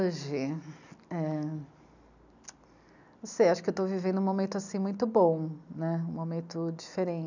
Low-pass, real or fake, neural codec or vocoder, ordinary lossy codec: 7.2 kHz; real; none; none